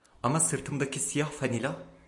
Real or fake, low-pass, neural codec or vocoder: real; 10.8 kHz; none